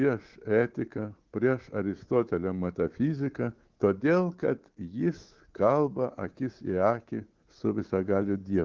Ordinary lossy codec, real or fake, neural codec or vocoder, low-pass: Opus, 16 kbps; fake; codec, 24 kHz, 3.1 kbps, DualCodec; 7.2 kHz